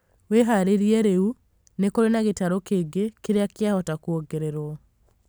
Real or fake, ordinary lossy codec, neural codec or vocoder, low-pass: real; none; none; none